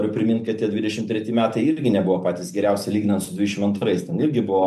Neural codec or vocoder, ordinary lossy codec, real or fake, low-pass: none; MP3, 64 kbps; real; 14.4 kHz